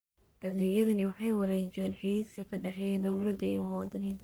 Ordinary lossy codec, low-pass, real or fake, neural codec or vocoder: none; none; fake; codec, 44.1 kHz, 1.7 kbps, Pupu-Codec